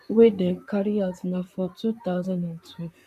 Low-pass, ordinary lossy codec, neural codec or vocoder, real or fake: 14.4 kHz; none; vocoder, 44.1 kHz, 128 mel bands, Pupu-Vocoder; fake